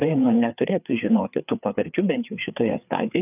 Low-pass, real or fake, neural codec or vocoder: 3.6 kHz; fake; codec, 16 kHz, 4 kbps, FreqCodec, larger model